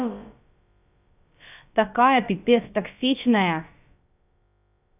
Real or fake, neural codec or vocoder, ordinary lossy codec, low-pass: fake; codec, 16 kHz, about 1 kbps, DyCAST, with the encoder's durations; none; 3.6 kHz